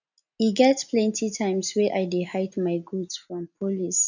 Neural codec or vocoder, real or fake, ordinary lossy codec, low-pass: none; real; none; 7.2 kHz